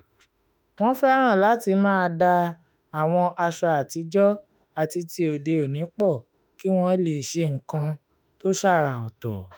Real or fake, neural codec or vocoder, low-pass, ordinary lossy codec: fake; autoencoder, 48 kHz, 32 numbers a frame, DAC-VAE, trained on Japanese speech; none; none